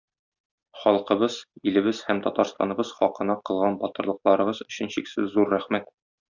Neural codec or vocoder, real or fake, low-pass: none; real; 7.2 kHz